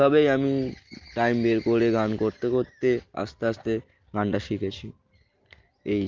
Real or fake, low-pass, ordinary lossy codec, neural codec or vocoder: real; 7.2 kHz; Opus, 32 kbps; none